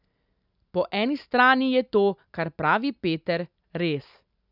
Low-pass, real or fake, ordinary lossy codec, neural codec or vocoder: 5.4 kHz; real; none; none